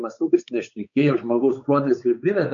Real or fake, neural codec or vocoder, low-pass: fake; codec, 16 kHz, 4 kbps, X-Codec, WavLM features, trained on Multilingual LibriSpeech; 7.2 kHz